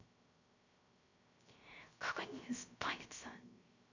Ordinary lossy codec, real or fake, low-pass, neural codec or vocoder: none; fake; 7.2 kHz; codec, 16 kHz, 0.3 kbps, FocalCodec